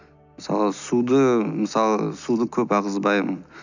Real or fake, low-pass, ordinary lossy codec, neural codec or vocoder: real; 7.2 kHz; none; none